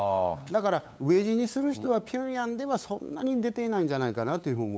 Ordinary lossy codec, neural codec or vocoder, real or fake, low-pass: none; codec, 16 kHz, 8 kbps, FunCodec, trained on LibriTTS, 25 frames a second; fake; none